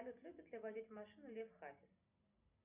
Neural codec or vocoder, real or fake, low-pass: none; real; 3.6 kHz